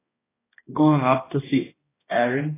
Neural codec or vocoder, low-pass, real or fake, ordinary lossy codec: codec, 16 kHz, 1 kbps, X-Codec, HuBERT features, trained on balanced general audio; 3.6 kHz; fake; AAC, 16 kbps